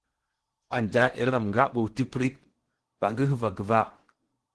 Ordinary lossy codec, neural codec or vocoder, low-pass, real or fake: Opus, 16 kbps; codec, 16 kHz in and 24 kHz out, 0.8 kbps, FocalCodec, streaming, 65536 codes; 10.8 kHz; fake